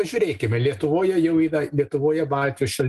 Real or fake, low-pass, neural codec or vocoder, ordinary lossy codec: real; 14.4 kHz; none; Opus, 24 kbps